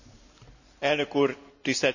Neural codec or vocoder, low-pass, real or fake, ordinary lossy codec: none; 7.2 kHz; real; none